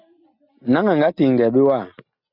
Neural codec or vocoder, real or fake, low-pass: none; real; 5.4 kHz